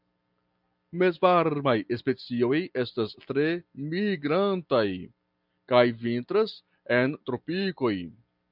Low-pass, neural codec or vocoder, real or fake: 5.4 kHz; none; real